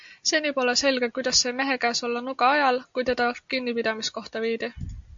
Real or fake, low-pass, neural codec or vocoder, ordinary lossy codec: real; 7.2 kHz; none; AAC, 64 kbps